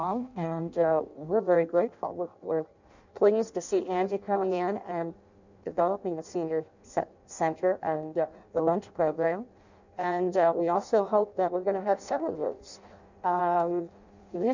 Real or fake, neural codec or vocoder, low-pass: fake; codec, 16 kHz in and 24 kHz out, 0.6 kbps, FireRedTTS-2 codec; 7.2 kHz